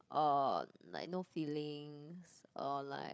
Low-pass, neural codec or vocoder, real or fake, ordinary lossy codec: none; codec, 16 kHz, 8 kbps, FreqCodec, larger model; fake; none